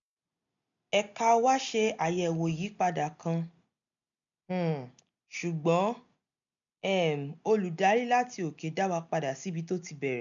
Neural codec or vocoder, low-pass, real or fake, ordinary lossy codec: none; 7.2 kHz; real; none